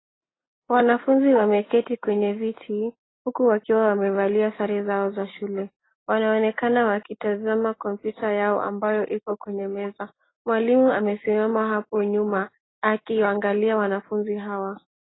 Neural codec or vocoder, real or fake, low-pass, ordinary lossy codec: none; real; 7.2 kHz; AAC, 16 kbps